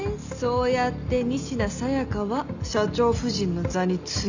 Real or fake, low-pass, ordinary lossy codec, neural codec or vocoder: real; 7.2 kHz; none; none